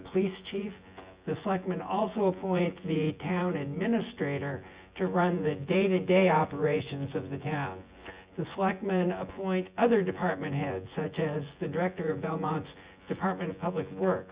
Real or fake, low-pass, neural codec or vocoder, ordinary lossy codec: fake; 3.6 kHz; vocoder, 24 kHz, 100 mel bands, Vocos; Opus, 64 kbps